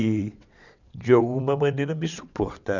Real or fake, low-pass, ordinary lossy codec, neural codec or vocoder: fake; 7.2 kHz; none; vocoder, 22.05 kHz, 80 mel bands, WaveNeXt